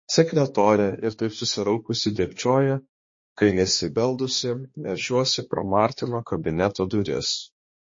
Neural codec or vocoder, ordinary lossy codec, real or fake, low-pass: codec, 16 kHz, 2 kbps, X-Codec, HuBERT features, trained on balanced general audio; MP3, 32 kbps; fake; 7.2 kHz